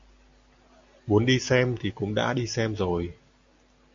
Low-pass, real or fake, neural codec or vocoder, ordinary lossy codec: 7.2 kHz; real; none; MP3, 48 kbps